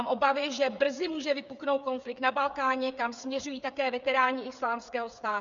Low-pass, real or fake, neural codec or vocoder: 7.2 kHz; fake; codec, 16 kHz, 8 kbps, FreqCodec, smaller model